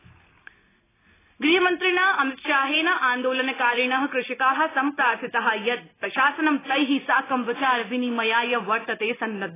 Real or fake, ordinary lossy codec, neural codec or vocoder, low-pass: real; AAC, 16 kbps; none; 3.6 kHz